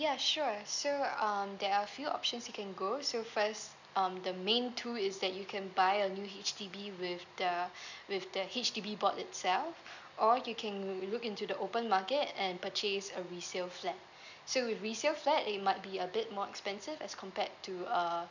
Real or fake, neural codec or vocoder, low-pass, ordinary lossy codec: real; none; 7.2 kHz; none